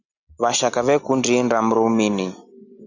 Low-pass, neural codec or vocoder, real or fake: 7.2 kHz; none; real